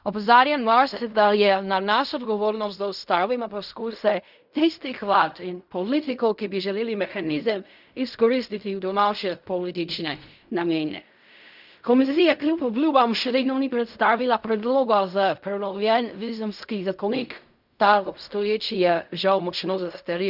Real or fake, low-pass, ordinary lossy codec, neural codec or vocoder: fake; 5.4 kHz; none; codec, 16 kHz in and 24 kHz out, 0.4 kbps, LongCat-Audio-Codec, fine tuned four codebook decoder